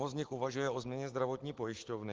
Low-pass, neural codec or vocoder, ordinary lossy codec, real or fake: 7.2 kHz; none; Opus, 16 kbps; real